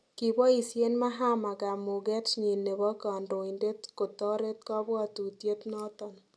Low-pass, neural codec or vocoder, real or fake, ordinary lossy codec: none; none; real; none